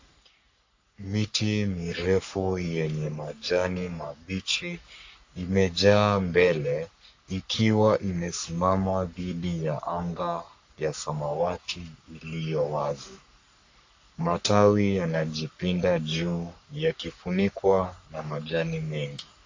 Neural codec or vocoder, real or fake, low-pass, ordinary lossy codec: codec, 44.1 kHz, 3.4 kbps, Pupu-Codec; fake; 7.2 kHz; AAC, 48 kbps